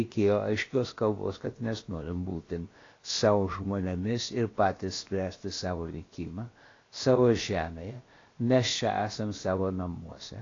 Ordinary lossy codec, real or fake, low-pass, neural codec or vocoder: AAC, 32 kbps; fake; 7.2 kHz; codec, 16 kHz, about 1 kbps, DyCAST, with the encoder's durations